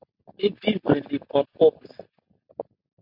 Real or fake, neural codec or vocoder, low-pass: real; none; 5.4 kHz